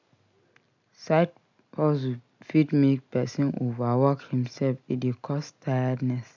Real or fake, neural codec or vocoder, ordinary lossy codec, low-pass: real; none; none; 7.2 kHz